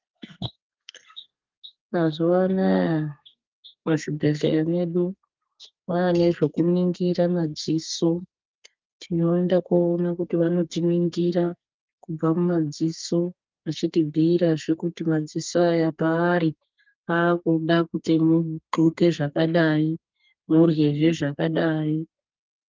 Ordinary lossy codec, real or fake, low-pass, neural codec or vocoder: Opus, 32 kbps; fake; 7.2 kHz; codec, 32 kHz, 1.9 kbps, SNAC